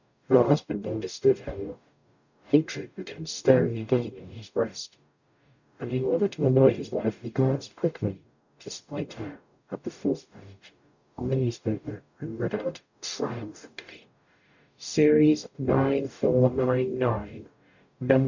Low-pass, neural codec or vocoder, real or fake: 7.2 kHz; codec, 44.1 kHz, 0.9 kbps, DAC; fake